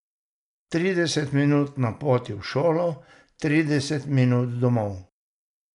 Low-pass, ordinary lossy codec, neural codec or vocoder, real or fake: 10.8 kHz; none; none; real